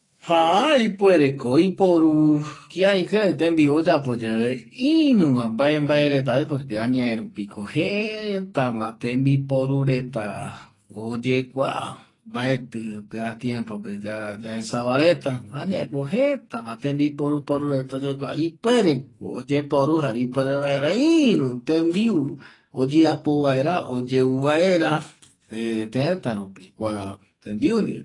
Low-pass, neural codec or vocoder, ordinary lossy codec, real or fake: 10.8 kHz; codec, 44.1 kHz, 2.6 kbps, SNAC; AAC, 32 kbps; fake